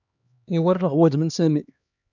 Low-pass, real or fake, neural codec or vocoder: 7.2 kHz; fake; codec, 16 kHz, 2 kbps, X-Codec, HuBERT features, trained on LibriSpeech